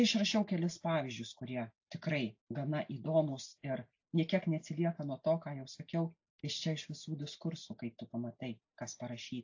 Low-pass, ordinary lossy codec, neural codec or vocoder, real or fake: 7.2 kHz; AAC, 48 kbps; none; real